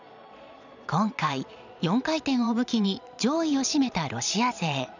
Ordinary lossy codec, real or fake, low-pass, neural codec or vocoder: none; fake; 7.2 kHz; vocoder, 22.05 kHz, 80 mel bands, Vocos